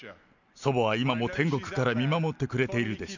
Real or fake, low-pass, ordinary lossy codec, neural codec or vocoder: real; 7.2 kHz; none; none